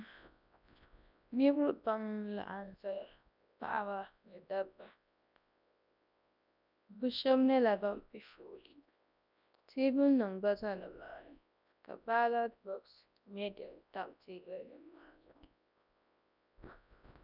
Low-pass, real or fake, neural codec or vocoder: 5.4 kHz; fake; codec, 24 kHz, 0.9 kbps, WavTokenizer, large speech release